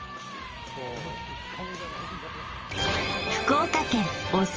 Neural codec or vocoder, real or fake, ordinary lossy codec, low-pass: vocoder, 44.1 kHz, 128 mel bands every 512 samples, BigVGAN v2; fake; Opus, 24 kbps; 7.2 kHz